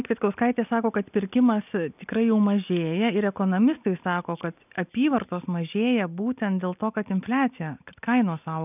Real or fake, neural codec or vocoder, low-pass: real; none; 3.6 kHz